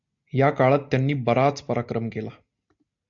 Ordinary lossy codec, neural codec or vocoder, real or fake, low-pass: MP3, 64 kbps; none; real; 7.2 kHz